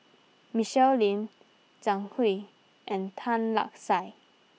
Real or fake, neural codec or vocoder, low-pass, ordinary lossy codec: real; none; none; none